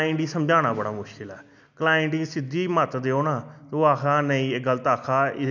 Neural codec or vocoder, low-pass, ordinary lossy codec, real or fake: none; 7.2 kHz; none; real